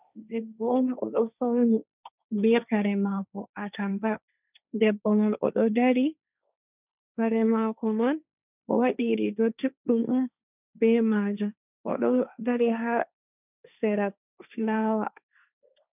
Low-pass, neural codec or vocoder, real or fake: 3.6 kHz; codec, 16 kHz, 1.1 kbps, Voila-Tokenizer; fake